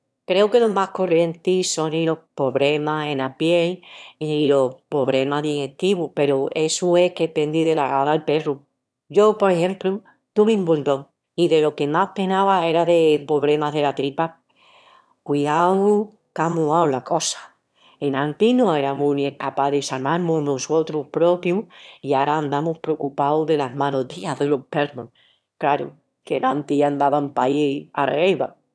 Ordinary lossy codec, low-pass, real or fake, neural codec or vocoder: none; none; fake; autoencoder, 22.05 kHz, a latent of 192 numbers a frame, VITS, trained on one speaker